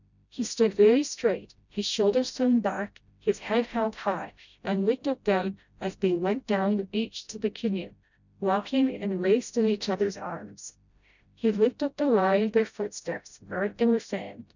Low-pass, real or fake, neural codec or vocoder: 7.2 kHz; fake; codec, 16 kHz, 0.5 kbps, FreqCodec, smaller model